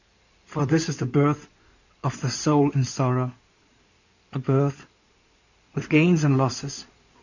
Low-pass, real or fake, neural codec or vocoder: 7.2 kHz; fake; codec, 16 kHz in and 24 kHz out, 2.2 kbps, FireRedTTS-2 codec